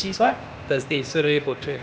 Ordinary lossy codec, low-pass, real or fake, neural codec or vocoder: none; none; fake; codec, 16 kHz, 0.8 kbps, ZipCodec